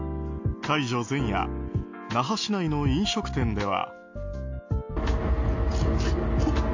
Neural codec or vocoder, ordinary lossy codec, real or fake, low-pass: none; AAC, 48 kbps; real; 7.2 kHz